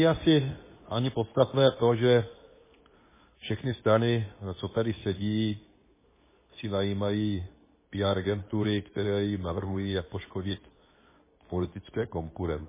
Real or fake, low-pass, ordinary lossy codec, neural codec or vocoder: fake; 3.6 kHz; MP3, 16 kbps; codec, 24 kHz, 0.9 kbps, WavTokenizer, medium speech release version 2